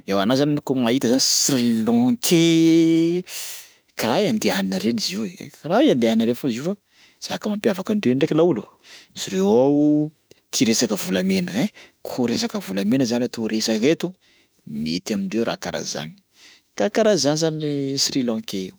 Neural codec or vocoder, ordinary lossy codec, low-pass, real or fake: autoencoder, 48 kHz, 32 numbers a frame, DAC-VAE, trained on Japanese speech; none; none; fake